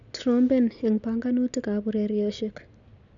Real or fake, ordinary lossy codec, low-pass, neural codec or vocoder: real; none; 7.2 kHz; none